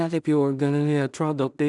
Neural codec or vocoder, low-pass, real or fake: codec, 16 kHz in and 24 kHz out, 0.4 kbps, LongCat-Audio-Codec, two codebook decoder; 10.8 kHz; fake